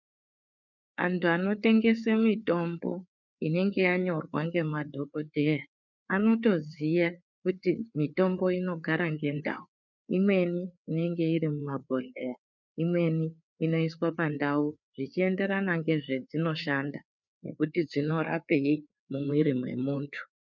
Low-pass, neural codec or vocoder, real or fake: 7.2 kHz; codec, 16 kHz, 4 kbps, FreqCodec, larger model; fake